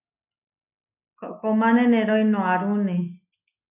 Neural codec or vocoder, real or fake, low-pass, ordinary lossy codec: none; real; 3.6 kHz; AAC, 32 kbps